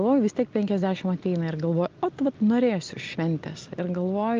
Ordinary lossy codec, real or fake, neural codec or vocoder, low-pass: Opus, 24 kbps; real; none; 7.2 kHz